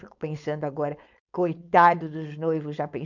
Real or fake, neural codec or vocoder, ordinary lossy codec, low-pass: fake; codec, 16 kHz, 4.8 kbps, FACodec; none; 7.2 kHz